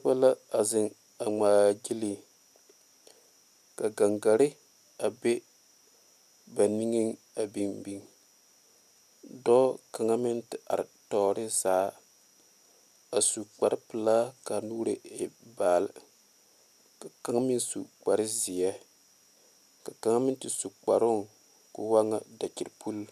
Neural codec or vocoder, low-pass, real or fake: none; 14.4 kHz; real